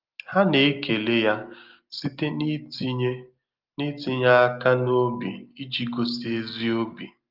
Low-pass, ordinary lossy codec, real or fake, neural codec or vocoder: 5.4 kHz; Opus, 32 kbps; real; none